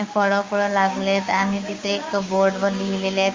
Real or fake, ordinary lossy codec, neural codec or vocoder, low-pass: fake; Opus, 32 kbps; codec, 16 kHz, 6 kbps, DAC; 7.2 kHz